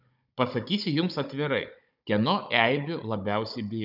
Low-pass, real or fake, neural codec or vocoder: 5.4 kHz; fake; codec, 16 kHz, 16 kbps, FunCodec, trained on Chinese and English, 50 frames a second